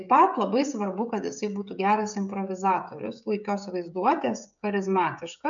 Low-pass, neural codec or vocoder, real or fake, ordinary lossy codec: 7.2 kHz; codec, 16 kHz, 16 kbps, FreqCodec, smaller model; fake; MP3, 96 kbps